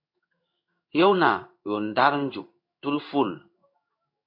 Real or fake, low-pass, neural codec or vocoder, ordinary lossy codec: fake; 5.4 kHz; codec, 16 kHz in and 24 kHz out, 1 kbps, XY-Tokenizer; MP3, 48 kbps